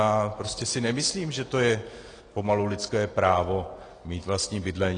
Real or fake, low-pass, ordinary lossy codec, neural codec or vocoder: real; 9.9 kHz; AAC, 32 kbps; none